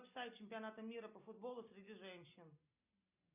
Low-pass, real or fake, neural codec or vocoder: 3.6 kHz; real; none